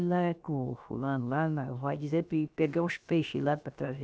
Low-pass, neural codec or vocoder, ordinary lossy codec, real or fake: none; codec, 16 kHz, about 1 kbps, DyCAST, with the encoder's durations; none; fake